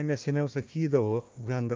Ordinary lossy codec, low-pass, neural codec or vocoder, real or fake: Opus, 24 kbps; 7.2 kHz; codec, 16 kHz, 1 kbps, FunCodec, trained on Chinese and English, 50 frames a second; fake